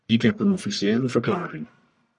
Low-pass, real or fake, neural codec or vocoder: 10.8 kHz; fake; codec, 44.1 kHz, 1.7 kbps, Pupu-Codec